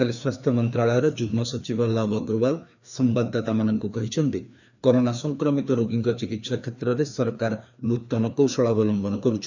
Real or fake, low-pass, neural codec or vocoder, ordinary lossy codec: fake; 7.2 kHz; codec, 16 kHz, 2 kbps, FreqCodec, larger model; none